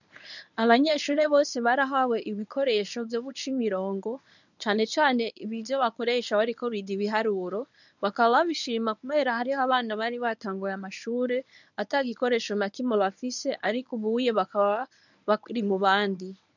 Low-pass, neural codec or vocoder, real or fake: 7.2 kHz; codec, 24 kHz, 0.9 kbps, WavTokenizer, medium speech release version 1; fake